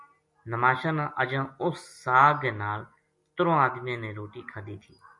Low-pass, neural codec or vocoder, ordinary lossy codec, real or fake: 10.8 kHz; none; MP3, 48 kbps; real